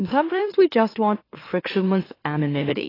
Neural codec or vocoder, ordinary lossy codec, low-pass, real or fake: autoencoder, 44.1 kHz, a latent of 192 numbers a frame, MeloTTS; AAC, 24 kbps; 5.4 kHz; fake